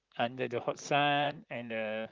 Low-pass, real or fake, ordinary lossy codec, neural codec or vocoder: 7.2 kHz; fake; Opus, 24 kbps; vocoder, 44.1 kHz, 128 mel bands, Pupu-Vocoder